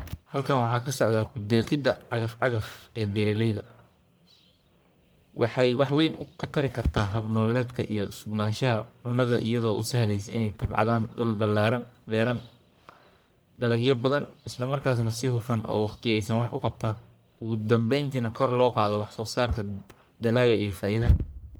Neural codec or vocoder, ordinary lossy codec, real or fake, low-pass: codec, 44.1 kHz, 1.7 kbps, Pupu-Codec; none; fake; none